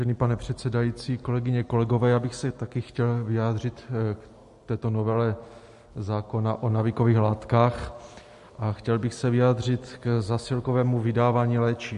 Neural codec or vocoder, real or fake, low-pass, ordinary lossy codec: none; real; 14.4 kHz; MP3, 48 kbps